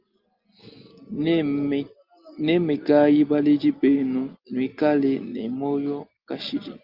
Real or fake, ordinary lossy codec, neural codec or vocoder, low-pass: real; Opus, 32 kbps; none; 5.4 kHz